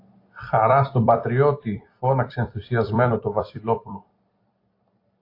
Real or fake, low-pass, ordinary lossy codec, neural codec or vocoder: fake; 5.4 kHz; AAC, 32 kbps; vocoder, 44.1 kHz, 128 mel bands every 256 samples, BigVGAN v2